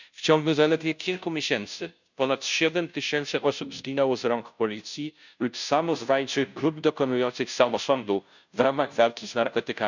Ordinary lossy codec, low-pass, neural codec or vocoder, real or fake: none; 7.2 kHz; codec, 16 kHz, 0.5 kbps, FunCodec, trained on Chinese and English, 25 frames a second; fake